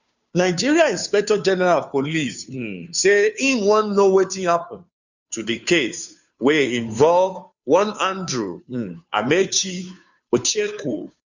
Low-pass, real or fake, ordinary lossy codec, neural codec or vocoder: 7.2 kHz; fake; none; codec, 16 kHz, 2 kbps, FunCodec, trained on Chinese and English, 25 frames a second